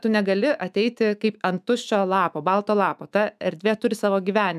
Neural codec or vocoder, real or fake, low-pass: autoencoder, 48 kHz, 128 numbers a frame, DAC-VAE, trained on Japanese speech; fake; 14.4 kHz